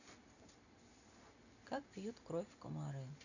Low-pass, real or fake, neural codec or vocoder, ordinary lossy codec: 7.2 kHz; real; none; none